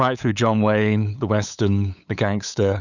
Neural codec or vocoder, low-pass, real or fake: codec, 16 kHz, 8 kbps, FunCodec, trained on LibriTTS, 25 frames a second; 7.2 kHz; fake